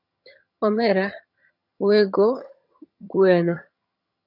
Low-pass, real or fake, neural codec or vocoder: 5.4 kHz; fake; vocoder, 22.05 kHz, 80 mel bands, HiFi-GAN